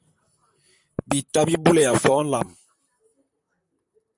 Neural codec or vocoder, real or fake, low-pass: vocoder, 44.1 kHz, 128 mel bands, Pupu-Vocoder; fake; 10.8 kHz